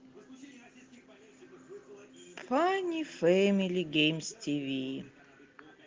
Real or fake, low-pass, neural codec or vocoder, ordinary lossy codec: real; 7.2 kHz; none; Opus, 16 kbps